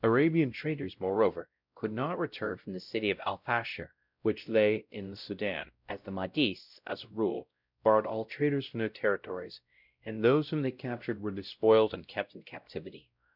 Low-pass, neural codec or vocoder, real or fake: 5.4 kHz; codec, 16 kHz, 0.5 kbps, X-Codec, WavLM features, trained on Multilingual LibriSpeech; fake